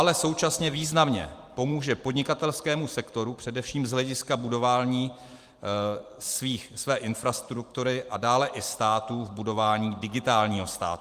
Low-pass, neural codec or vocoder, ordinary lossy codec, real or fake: 14.4 kHz; vocoder, 44.1 kHz, 128 mel bands every 512 samples, BigVGAN v2; Opus, 32 kbps; fake